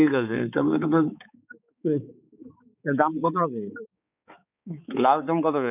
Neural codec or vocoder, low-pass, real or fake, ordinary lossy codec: codec, 16 kHz, 4 kbps, X-Codec, HuBERT features, trained on balanced general audio; 3.6 kHz; fake; none